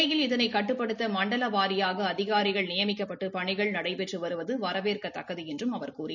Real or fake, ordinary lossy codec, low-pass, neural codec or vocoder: real; none; 7.2 kHz; none